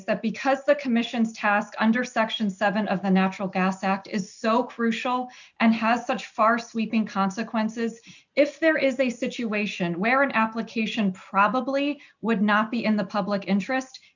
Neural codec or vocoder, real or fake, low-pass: none; real; 7.2 kHz